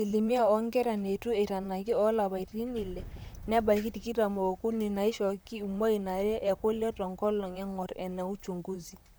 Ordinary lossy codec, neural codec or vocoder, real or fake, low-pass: none; vocoder, 44.1 kHz, 128 mel bands, Pupu-Vocoder; fake; none